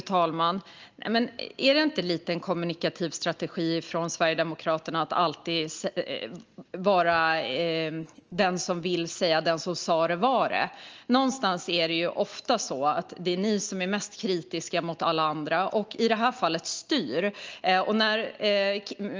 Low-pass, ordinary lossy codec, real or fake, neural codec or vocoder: 7.2 kHz; Opus, 24 kbps; real; none